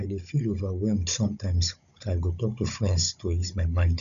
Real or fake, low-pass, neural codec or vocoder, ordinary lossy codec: fake; 7.2 kHz; codec, 16 kHz, 16 kbps, FunCodec, trained on Chinese and English, 50 frames a second; none